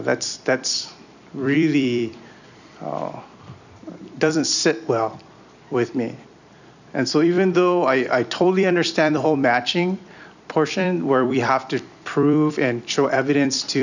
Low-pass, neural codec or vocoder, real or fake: 7.2 kHz; vocoder, 44.1 kHz, 128 mel bands every 256 samples, BigVGAN v2; fake